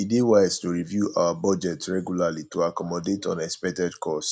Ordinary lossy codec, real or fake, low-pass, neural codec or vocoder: none; real; none; none